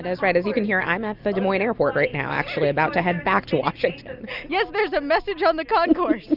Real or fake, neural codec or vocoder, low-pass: real; none; 5.4 kHz